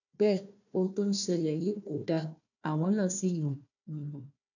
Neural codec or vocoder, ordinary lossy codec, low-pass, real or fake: codec, 16 kHz, 1 kbps, FunCodec, trained on Chinese and English, 50 frames a second; none; 7.2 kHz; fake